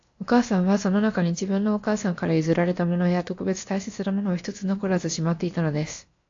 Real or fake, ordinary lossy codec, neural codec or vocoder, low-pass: fake; AAC, 48 kbps; codec, 16 kHz, about 1 kbps, DyCAST, with the encoder's durations; 7.2 kHz